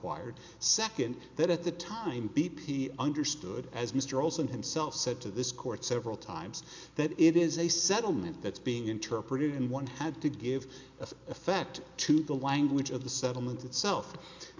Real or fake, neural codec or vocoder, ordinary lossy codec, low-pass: real; none; MP3, 64 kbps; 7.2 kHz